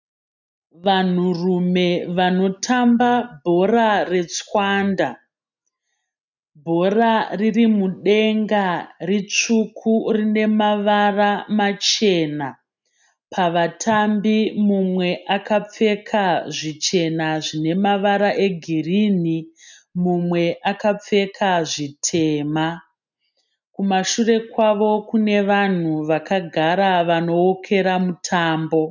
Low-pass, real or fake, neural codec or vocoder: 7.2 kHz; real; none